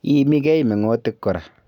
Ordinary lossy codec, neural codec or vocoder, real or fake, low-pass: none; none; real; 19.8 kHz